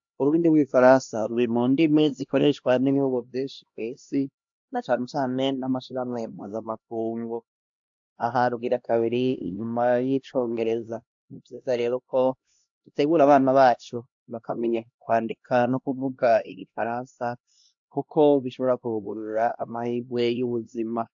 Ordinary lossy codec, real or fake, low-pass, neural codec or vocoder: AAC, 64 kbps; fake; 7.2 kHz; codec, 16 kHz, 1 kbps, X-Codec, HuBERT features, trained on LibriSpeech